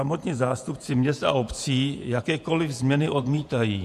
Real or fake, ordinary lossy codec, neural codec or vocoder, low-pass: fake; MP3, 64 kbps; vocoder, 48 kHz, 128 mel bands, Vocos; 14.4 kHz